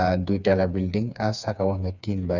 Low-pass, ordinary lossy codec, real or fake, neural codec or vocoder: 7.2 kHz; none; fake; codec, 16 kHz, 4 kbps, FreqCodec, smaller model